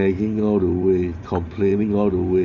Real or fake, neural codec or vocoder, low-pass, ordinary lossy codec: fake; vocoder, 44.1 kHz, 128 mel bands every 512 samples, BigVGAN v2; 7.2 kHz; none